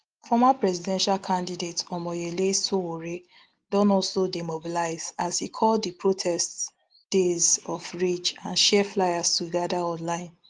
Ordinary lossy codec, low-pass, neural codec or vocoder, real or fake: Opus, 24 kbps; 9.9 kHz; none; real